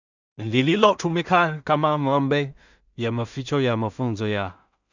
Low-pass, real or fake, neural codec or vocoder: 7.2 kHz; fake; codec, 16 kHz in and 24 kHz out, 0.4 kbps, LongCat-Audio-Codec, two codebook decoder